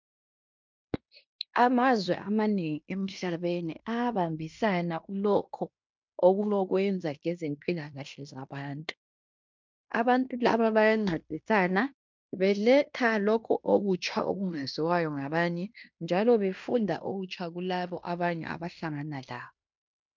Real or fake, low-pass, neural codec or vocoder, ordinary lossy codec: fake; 7.2 kHz; codec, 16 kHz in and 24 kHz out, 0.9 kbps, LongCat-Audio-Codec, fine tuned four codebook decoder; MP3, 64 kbps